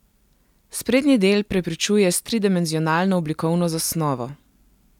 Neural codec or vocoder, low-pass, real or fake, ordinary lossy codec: none; 19.8 kHz; real; none